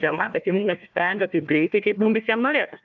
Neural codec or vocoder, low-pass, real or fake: codec, 16 kHz, 1 kbps, FunCodec, trained on Chinese and English, 50 frames a second; 7.2 kHz; fake